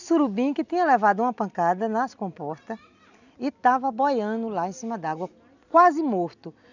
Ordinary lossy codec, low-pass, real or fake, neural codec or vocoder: none; 7.2 kHz; real; none